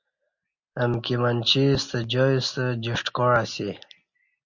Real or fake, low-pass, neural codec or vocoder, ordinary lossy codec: real; 7.2 kHz; none; MP3, 48 kbps